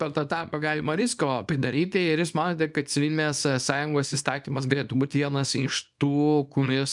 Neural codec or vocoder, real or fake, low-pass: codec, 24 kHz, 0.9 kbps, WavTokenizer, small release; fake; 10.8 kHz